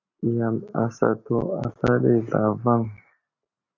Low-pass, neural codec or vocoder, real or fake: 7.2 kHz; none; real